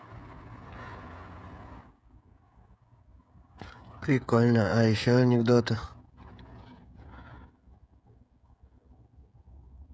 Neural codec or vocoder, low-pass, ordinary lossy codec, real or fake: codec, 16 kHz, 16 kbps, FreqCodec, smaller model; none; none; fake